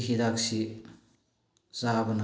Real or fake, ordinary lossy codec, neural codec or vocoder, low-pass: real; none; none; none